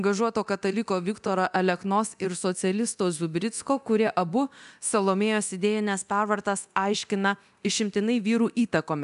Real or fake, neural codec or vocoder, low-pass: fake; codec, 24 kHz, 0.9 kbps, DualCodec; 10.8 kHz